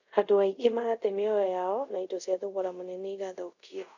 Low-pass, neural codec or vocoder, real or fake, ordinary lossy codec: 7.2 kHz; codec, 24 kHz, 0.5 kbps, DualCodec; fake; none